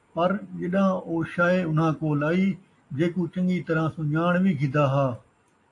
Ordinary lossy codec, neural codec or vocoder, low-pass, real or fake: AAC, 48 kbps; none; 10.8 kHz; real